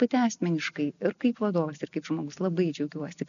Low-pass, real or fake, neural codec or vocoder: 7.2 kHz; real; none